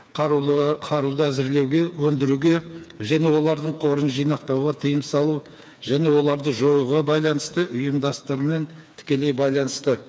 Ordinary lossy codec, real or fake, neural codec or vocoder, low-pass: none; fake; codec, 16 kHz, 4 kbps, FreqCodec, smaller model; none